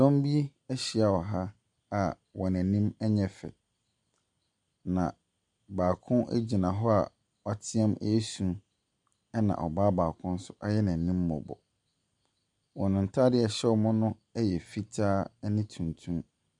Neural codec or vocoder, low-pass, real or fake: none; 10.8 kHz; real